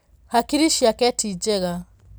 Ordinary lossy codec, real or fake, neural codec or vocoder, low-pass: none; real; none; none